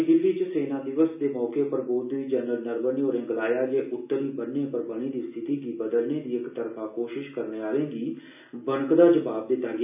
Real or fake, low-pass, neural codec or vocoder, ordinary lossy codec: real; 3.6 kHz; none; none